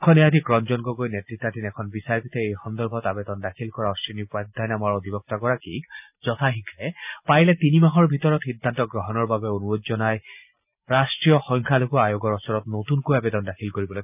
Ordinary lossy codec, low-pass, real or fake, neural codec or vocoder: none; 3.6 kHz; real; none